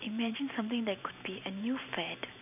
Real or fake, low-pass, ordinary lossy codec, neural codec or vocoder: fake; 3.6 kHz; none; vocoder, 44.1 kHz, 128 mel bands every 512 samples, BigVGAN v2